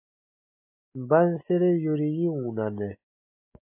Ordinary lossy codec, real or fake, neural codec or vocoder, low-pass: MP3, 32 kbps; real; none; 3.6 kHz